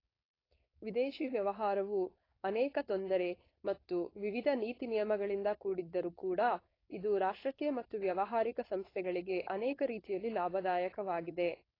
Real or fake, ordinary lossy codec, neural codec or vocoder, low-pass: fake; AAC, 24 kbps; codec, 16 kHz, 4.8 kbps, FACodec; 5.4 kHz